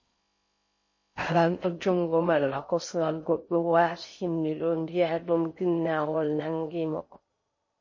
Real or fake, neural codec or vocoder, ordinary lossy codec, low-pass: fake; codec, 16 kHz in and 24 kHz out, 0.6 kbps, FocalCodec, streaming, 4096 codes; MP3, 32 kbps; 7.2 kHz